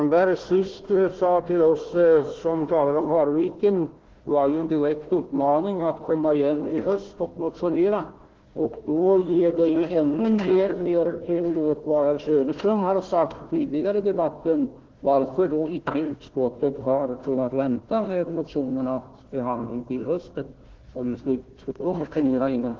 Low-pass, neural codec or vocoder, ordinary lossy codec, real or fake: 7.2 kHz; codec, 16 kHz, 1 kbps, FunCodec, trained on Chinese and English, 50 frames a second; Opus, 16 kbps; fake